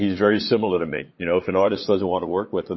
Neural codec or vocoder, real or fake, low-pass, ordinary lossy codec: vocoder, 22.05 kHz, 80 mel bands, Vocos; fake; 7.2 kHz; MP3, 24 kbps